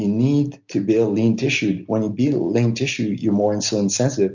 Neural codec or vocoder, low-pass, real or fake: none; 7.2 kHz; real